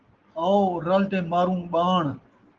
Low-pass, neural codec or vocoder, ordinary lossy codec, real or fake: 7.2 kHz; none; Opus, 16 kbps; real